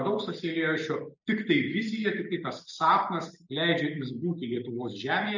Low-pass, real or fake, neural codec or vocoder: 7.2 kHz; real; none